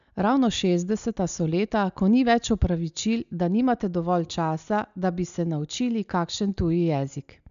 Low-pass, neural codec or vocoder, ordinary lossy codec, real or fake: 7.2 kHz; none; none; real